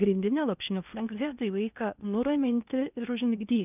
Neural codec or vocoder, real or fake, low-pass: codec, 16 kHz in and 24 kHz out, 0.8 kbps, FocalCodec, streaming, 65536 codes; fake; 3.6 kHz